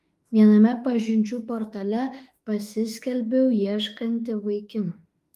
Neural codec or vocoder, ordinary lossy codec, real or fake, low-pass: autoencoder, 48 kHz, 32 numbers a frame, DAC-VAE, trained on Japanese speech; Opus, 32 kbps; fake; 14.4 kHz